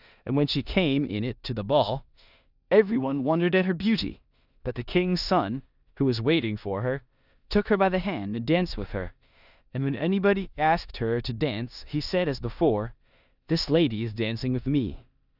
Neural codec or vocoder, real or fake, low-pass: codec, 16 kHz in and 24 kHz out, 0.9 kbps, LongCat-Audio-Codec, four codebook decoder; fake; 5.4 kHz